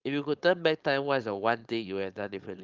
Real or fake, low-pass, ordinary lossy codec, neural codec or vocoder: fake; 7.2 kHz; Opus, 32 kbps; codec, 16 kHz, 4.8 kbps, FACodec